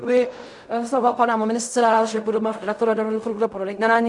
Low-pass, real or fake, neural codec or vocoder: 10.8 kHz; fake; codec, 16 kHz in and 24 kHz out, 0.4 kbps, LongCat-Audio-Codec, fine tuned four codebook decoder